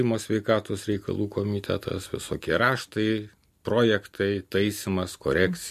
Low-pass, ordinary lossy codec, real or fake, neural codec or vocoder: 14.4 kHz; MP3, 64 kbps; real; none